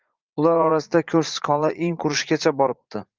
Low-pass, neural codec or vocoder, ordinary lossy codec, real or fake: 7.2 kHz; vocoder, 44.1 kHz, 80 mel bands, Vocos; Opus, 32 kbps; fake